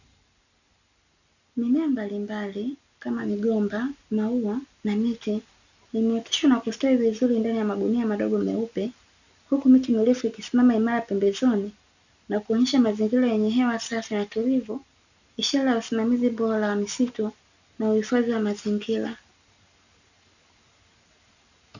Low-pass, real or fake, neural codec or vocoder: 7.2 kHz; real; none